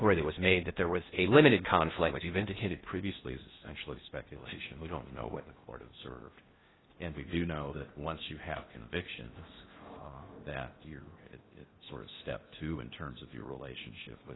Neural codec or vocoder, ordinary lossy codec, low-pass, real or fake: codec, 16 kHz in and 24 kHz out, 0.6 kbps, FocalCodec, streaming, 4096 codes; AAC, 16 kbps; 7.2 kHz; fake